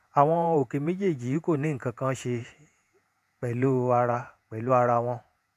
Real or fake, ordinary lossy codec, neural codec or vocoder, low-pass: fake; none; vocoder, 48 kHz, 128 mel bands, Vocos; 14.4 kHz